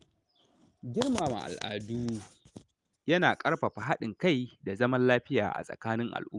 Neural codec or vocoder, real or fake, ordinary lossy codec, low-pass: none; real; Opus, 32 kbps; 10.8 kHz